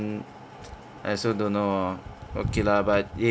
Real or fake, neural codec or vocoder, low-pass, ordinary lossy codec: real; none; none; none